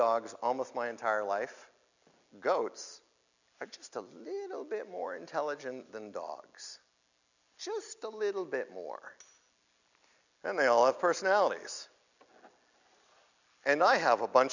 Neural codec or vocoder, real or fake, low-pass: none; real; 7.2 kHz